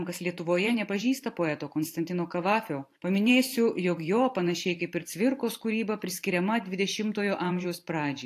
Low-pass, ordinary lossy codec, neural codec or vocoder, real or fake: 14.4 kHz; AAC, 64 kbps; vocoder, 44.1 kHz, 128 mel bands every 512 samples, BigVGAN v2; fake